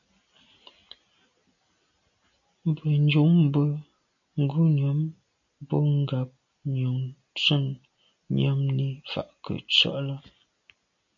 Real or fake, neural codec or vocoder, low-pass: real; none; 7.2 kHz